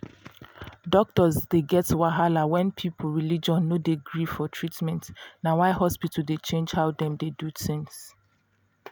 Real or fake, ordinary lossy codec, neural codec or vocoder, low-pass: real; none; none; none